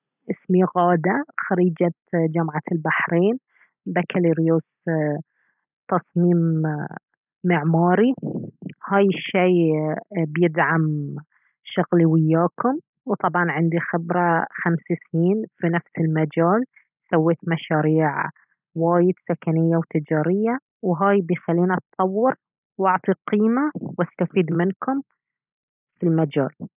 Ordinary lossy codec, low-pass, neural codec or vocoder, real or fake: none; 3.6 kHz; none; real